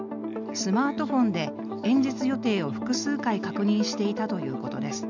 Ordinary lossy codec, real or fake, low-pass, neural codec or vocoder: none; real; 7.2 kHz; none